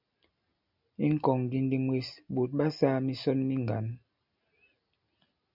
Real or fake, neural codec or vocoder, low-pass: real; none; 5.4 kHz